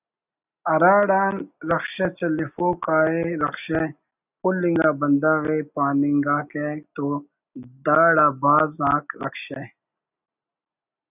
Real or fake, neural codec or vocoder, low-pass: real; none; 3.6 kHz